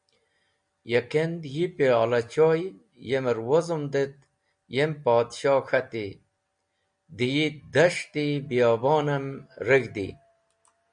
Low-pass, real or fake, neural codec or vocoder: 9.9 kHz; real; none